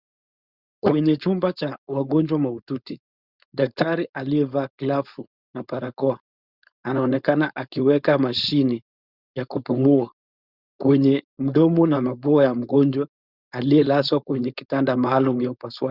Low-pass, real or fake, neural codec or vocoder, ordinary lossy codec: 5.4 kHz; fake; codec, 16 kHz, 4.8 kbps, FACodec; Opus, 64 kbps